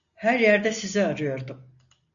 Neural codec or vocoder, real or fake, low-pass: none; real; 7.2 kHz